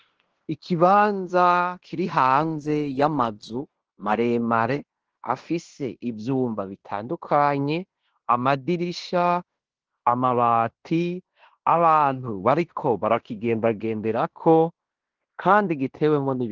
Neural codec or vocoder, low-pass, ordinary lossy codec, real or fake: codec, 16 kHz in and 24 kHz out, 0.9 kbps, LongCat-Audio-Codec, fine tuned four codebook decoder; 7.2 kHz; Opus, 16 kbps; fake